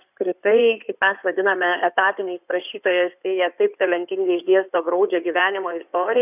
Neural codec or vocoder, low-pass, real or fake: codec, 16 kHz in and 24 kHz out, 2.2 kbps, FireRedTTS-2 codec; 3.6 kHz; fake